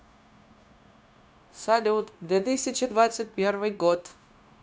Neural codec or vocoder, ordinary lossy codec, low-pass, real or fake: codec, 16 kHz, 0.9 kbps, LongCat-Audio-Codec; none; none; fake